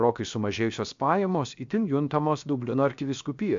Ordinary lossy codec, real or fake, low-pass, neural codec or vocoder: AAC, 64 kbps; fake; 7.2 kHz; codec, 16 kHz, about 1 kbps, DyCAST, with the encoder's durations